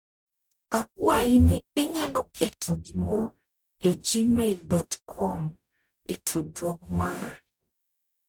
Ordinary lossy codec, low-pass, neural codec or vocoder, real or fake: none; none; codec, 44.1 kHz, 0.9 kbps, DAC; fake